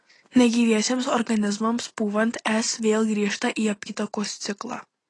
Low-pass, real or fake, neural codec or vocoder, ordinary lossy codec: 10.8 kHz; real; none; AAC, 32 kbps